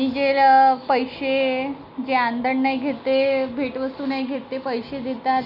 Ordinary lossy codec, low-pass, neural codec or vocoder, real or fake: none; 5.4 kHz; none; real